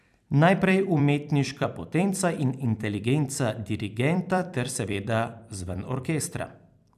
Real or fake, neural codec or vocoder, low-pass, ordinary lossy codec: fake; vocoder, 44.1 kHz, 128 mel bands every 256 samples, BigVGAN v2; 14.4 kHz; none